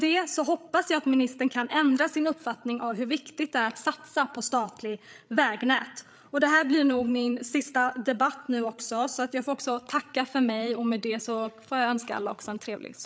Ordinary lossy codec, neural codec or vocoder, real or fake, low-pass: none; codec, 16 kHz, 8 kbps, FreqCodec, larger model; fake; none